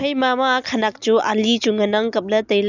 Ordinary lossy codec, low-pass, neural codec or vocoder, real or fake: none; 7.2 kHz; none; real